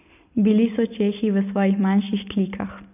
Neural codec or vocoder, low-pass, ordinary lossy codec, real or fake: none; 3.6 kHz; none; real